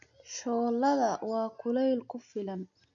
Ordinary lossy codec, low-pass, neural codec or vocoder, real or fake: none; 7.2 kHz; none; real